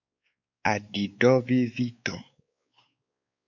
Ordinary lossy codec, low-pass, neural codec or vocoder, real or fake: MP3, 64 kbps; 7.2 kHz; codec, 16 kHz, 4 kbps, X-Codec, WavLM features, trained on Multilingual LibriSpeech; fake